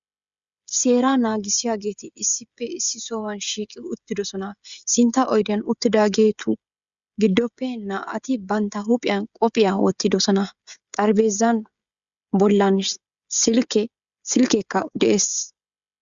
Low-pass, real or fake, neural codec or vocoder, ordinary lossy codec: 7.2 kHz; fake; codec, 16 kHz, 16 kbps, FreqCodec, smaller model; Opus, 64 kbps